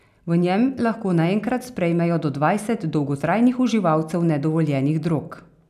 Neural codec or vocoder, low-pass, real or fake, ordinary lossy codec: none; 14.4 kHz; real; none